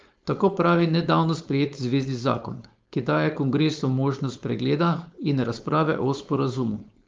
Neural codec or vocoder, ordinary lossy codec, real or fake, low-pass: codec, 16 kHz, 4.8 kbps, FACodec; Opus, 32 kbps; fake; 7.2 kHz